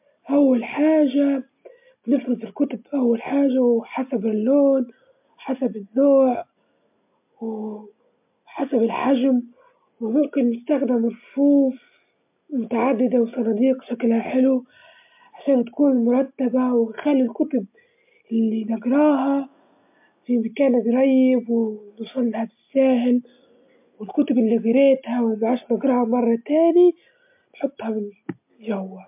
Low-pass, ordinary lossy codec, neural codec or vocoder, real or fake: 3.6 kHz; MP3, 32 kbps; none; real